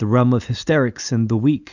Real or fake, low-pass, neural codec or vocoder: real; 7.2 kHz; none